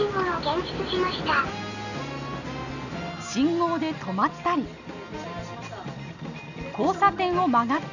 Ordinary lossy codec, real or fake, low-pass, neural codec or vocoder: none; real; 7.2 kHz; none